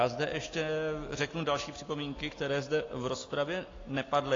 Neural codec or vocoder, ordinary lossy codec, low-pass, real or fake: none; AAC, 32 kbps; 7.2 kHz; real